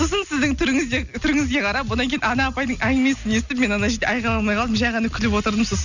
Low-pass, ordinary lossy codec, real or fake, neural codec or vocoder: 7.2 kHz; none; real; none